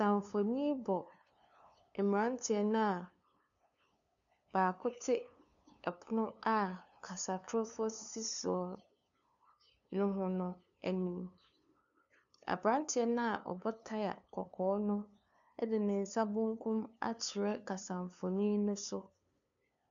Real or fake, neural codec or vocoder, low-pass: fake; codec, 16 kHz, 2 kbps, FunCodec, trained on LibriTTS, 25 frames a second; 7.2 kHz